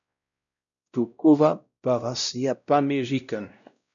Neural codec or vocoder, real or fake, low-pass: codec, 16 kHz, 0.5 kbps, X-Codec, WavLM features, trained on Multilingual LibriSpeech; fake; 7.2 kHz